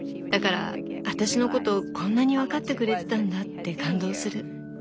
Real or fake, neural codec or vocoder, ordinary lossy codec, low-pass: real; none; none; none